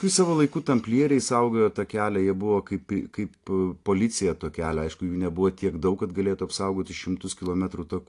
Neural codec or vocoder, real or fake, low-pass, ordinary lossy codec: none; real; 10.8 kHz; AAC, 48 kbps